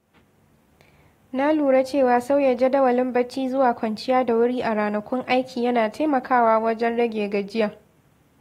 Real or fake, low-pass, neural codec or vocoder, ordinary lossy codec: real; 19.8 kHz; none; AAC, 48 kbps